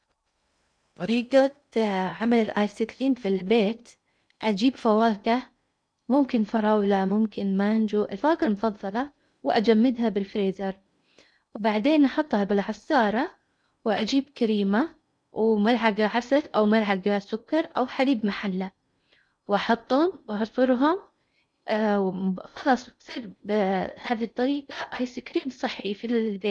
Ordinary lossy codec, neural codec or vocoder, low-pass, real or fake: none; codec, 16 kHz in and 24 kHz out, 0.6 kbps, FocalCodec, streaming, 2048 codes; 9.9 kHz; fake